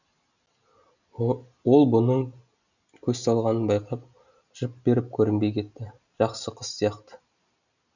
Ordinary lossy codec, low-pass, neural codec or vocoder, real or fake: none; 7.2 kHz; none; real